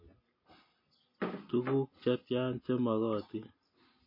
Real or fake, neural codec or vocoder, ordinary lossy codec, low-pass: real; none; MP3, 24 kbps; 5.4 kHz